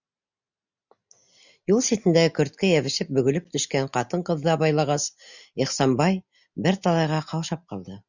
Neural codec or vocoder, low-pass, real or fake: none; 7.2 kHz; real